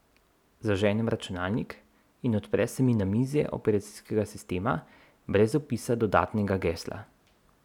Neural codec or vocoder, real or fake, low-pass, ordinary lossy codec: none; real; 19.8 kHz; none